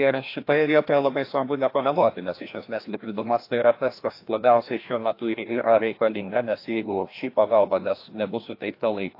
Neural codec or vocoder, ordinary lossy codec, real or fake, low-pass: codec, 16 kHz, 1 kbps, FreqCodec, larger model; AAC, 32 kbps; fake; 5.4 kHz